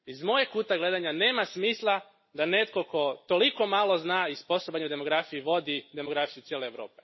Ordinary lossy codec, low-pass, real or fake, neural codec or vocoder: MP3, 24 kbps; 7.2 kHz; real; none